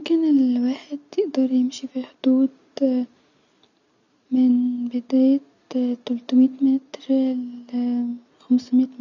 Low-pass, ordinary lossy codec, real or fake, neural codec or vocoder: 7.2 kHz; none; real; none